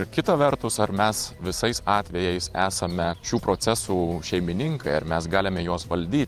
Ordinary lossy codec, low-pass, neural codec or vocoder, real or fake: Opus, 32 kbps; 14.4 kHz; vocoder, 44.1 kHz, 128 mel bands every 512 samples, BigVGAN v2; fake